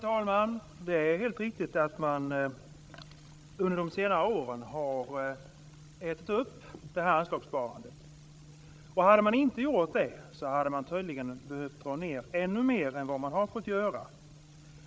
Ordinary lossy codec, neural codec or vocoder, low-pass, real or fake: none; codec, 16 kHz, 16 kbps, FreqCodec, larger model; none; fake